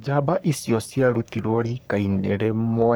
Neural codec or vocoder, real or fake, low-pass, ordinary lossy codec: codec, 44.1 kHz, 3.4 kbps, Pupu-Codec; fake; none; none